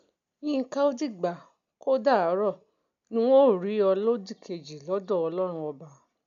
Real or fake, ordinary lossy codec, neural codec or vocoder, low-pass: real; AAC, 96 kbps; none; 7.2 kHz